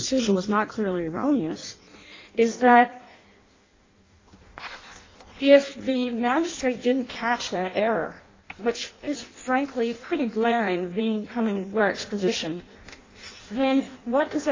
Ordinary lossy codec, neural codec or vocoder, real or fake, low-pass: AAC, 32 kbps; codec, 16 kHz in and 24 kHz out, 0.6 kbps, FireRedTTS-2 codec; fake; 7.2 kHz